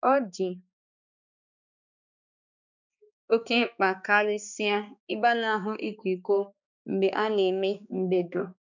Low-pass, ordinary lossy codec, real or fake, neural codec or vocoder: 7.2 kHz; none; fake; codec, 16 kHz, 2 kbps, X-Codec, HuBERT features, trained on balanced general audio